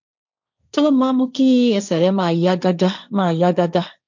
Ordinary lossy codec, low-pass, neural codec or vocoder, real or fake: none; 7.2 kHz; codec, 16 kHz, 1.1 kbps, Voila-Tokenizer; fake